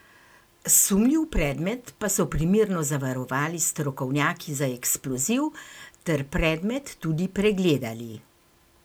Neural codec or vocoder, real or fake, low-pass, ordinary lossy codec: none; real; none; none